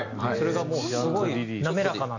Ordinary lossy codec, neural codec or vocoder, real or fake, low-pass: none; none; real; 7.2 kHz